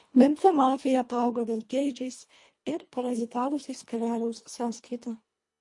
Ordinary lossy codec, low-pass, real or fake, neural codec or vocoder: MP3, 48 kbps; 10.8 kHz; fake; codec, 24 kHz, 1.5 kbps, HILCodec